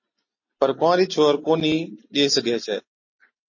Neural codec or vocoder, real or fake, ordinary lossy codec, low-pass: none; real; MP3, 32 kbps; 7.2 kHz